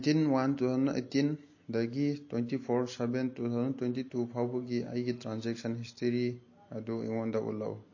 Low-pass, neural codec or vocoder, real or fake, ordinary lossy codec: 7.2 kHz; none; real; MP3, 32 kbps